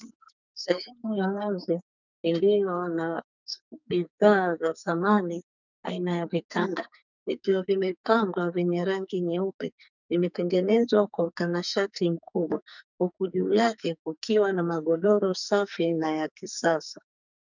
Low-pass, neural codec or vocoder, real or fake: 7.2 kHz; codec, 44.1 kHz, 2.6 kbps, SNAC; fake